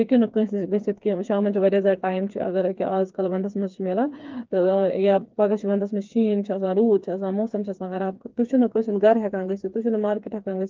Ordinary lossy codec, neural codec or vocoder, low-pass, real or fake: Opus, 32 kbps; codec, 16 kHz, 4 kbps, FreqCodec, smaller model; 7.2 kHz; fake